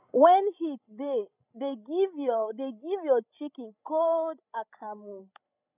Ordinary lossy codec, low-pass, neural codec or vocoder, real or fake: none; 3.6 kHz; codec, 16 kHz, 16 kbps, FreqCodec, larger model; fake